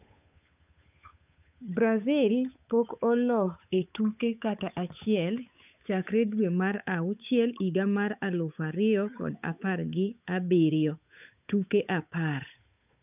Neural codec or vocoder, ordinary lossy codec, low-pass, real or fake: codec, 16 kHz, 8 kbps, FunCodec, trained on Chinese and English, 25 frames a second; none; 3.6 kHz; fake